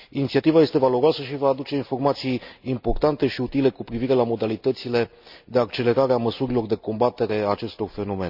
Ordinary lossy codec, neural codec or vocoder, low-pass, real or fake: none; none; 5.4 kHz; real